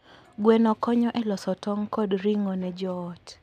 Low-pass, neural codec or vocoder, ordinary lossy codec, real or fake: 14.4 kHz; none; none; real